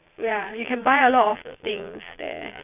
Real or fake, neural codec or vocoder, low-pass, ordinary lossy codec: fake; vocoder, 22.05 kHz, 80 mel bands, Vocos; 3.6 kHz; none